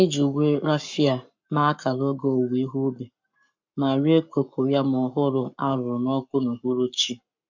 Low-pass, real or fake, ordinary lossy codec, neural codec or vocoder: 7.2 kHz; fake; MP3, 64 kbps; codec, 44.1 kHz, 7.8 kbps, Pupu-Codec